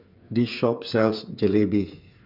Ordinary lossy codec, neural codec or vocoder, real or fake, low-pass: none; codec, 16 kHz, 8 kbps, FreqCodec, smaller model; fake; 5.4 kHz